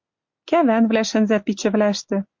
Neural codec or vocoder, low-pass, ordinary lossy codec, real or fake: none; 7.2 kHz; MP3, 48 kbps; real